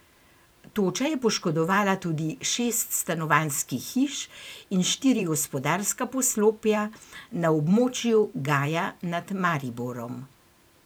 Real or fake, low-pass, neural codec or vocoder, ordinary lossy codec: fake; none; vocoder, 44.1 kHz, 128 mel bands every 512 samples, BigVGAN v2; none